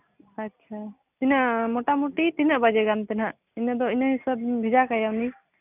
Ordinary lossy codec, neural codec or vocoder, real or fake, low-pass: none; none; real; 3.6 kHz